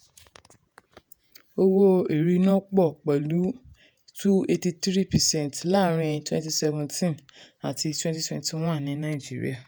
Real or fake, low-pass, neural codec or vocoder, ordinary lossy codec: fake; none; vocoder, 48 kHz, 128 mel bands, Vocos; none